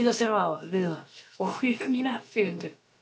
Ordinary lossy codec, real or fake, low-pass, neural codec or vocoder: none; fake; none; codec, 16 kHz, about 1 kbps, DyCAST, with the encoder's durations